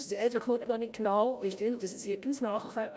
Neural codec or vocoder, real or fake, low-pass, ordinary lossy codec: codec, 16 kHz, 0.5 kbps, FreqCodec, larger model; fake; none; none